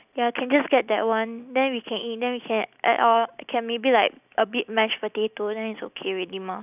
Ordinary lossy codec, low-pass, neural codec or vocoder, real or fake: none; 3.6 kHz; none; real